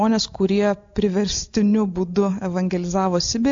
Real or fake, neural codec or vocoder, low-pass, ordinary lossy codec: real; none; 7.2 kHz; AAC, 64 kbps